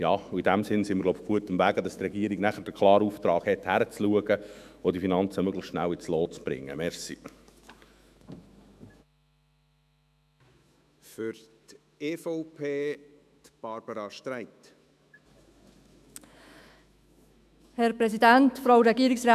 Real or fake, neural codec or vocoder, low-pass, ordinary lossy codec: fake; autoencoder, 48 kHz, 128 numbers a frame, DAC-VAE, trained on Japanese speech; 14.4 kHz; none